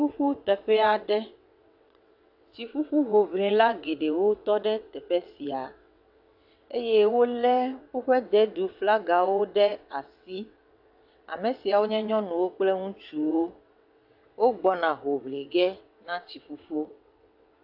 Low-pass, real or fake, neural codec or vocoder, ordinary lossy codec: 5.4 kHz; fake; vocoder, 22.05 kHz, 80 mel bands, WaveNeXt; AAC, 48 kbps